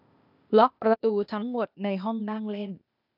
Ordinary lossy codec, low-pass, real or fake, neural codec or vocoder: none; 5.4 kHz; fake; codec, 16 kHz, 0.8 kbps, ZipCodec